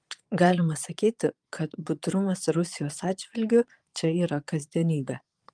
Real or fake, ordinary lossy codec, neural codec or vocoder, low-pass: fake; Opus, 32 kbps; vocoder, 22.05 kHz, 80 mel bands, Vocos; 9.9 kHz